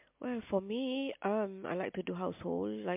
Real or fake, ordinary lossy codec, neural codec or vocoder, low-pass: real; MP3, 32 kbps; none; 3.6 kHz